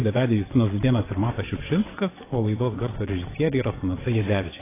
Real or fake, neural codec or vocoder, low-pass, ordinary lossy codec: fake; vocoder, 22.05 kHz, 80 mel bands, WaveNeXt; 3.6 kHz; AAC, 16 kbps